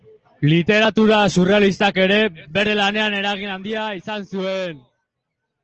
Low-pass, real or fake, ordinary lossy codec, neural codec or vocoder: 7.2 kHz; real; Opus, 16 kbps; none